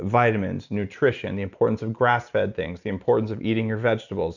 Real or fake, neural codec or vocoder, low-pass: real; none; 7.2 kHz